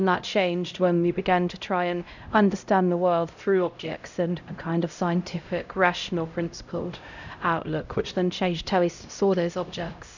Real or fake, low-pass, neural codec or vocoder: fake; 7.2 kHz; codec, 16 kHz, 0.5 kbps, X-Codec, HuBERT features, trained on LibriSpeech